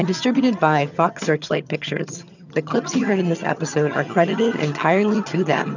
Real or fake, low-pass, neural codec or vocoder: fake; 7.2 kHz; vocoder, 22.05 kHz, 80 mel bands, HiFi-GAN